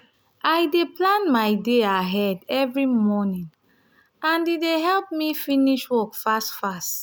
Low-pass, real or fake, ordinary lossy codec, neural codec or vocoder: none; real; none; none